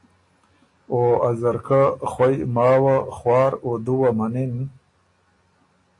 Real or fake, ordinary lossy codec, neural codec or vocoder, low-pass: real; AAC, 48 kbps; none; 10.8 kHz